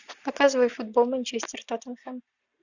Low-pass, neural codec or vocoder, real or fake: 7.2 kHz; none; real